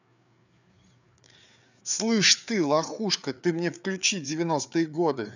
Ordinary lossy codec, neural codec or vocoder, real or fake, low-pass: none; codec, 16 kHz, 4 kbps, FreqCodec, larger model; fake; 7.2 kHz